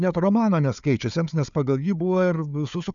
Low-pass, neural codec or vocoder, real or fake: 7.2 kHz; codec, 16 kHz, 4 kbps, FunCodec, trained on Chinese and English, 50 frames a second; fake